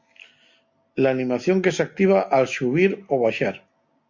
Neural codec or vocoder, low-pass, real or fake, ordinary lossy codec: none; 7.2 kHz; real; MP3, 48 kbps